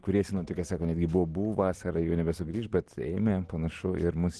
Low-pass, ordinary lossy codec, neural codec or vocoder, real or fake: 10.8 kHz; Opus, 16 kbps; none; real